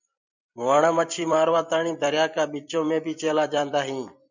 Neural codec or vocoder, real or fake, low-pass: vocoder, 44.1 kHz, 128 mel bands every 256 samples, BigVGAN v2; fake; 7.2 kHz